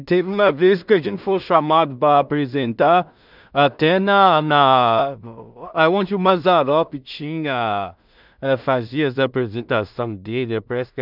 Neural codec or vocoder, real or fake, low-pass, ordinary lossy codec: codec, 16 kHz in and 24 kHz out, 0.4 kbps, LongCat-Audio-Codec, two codebook decoder; fake; 5.4 kHz; none